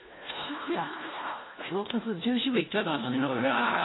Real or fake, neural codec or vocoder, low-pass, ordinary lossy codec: fake; codec, 16 kHz, 1 kbps, FunCodec, trained on LibriTTS, 50 frames a second; 7.2 kHz; AAC, 16 kbps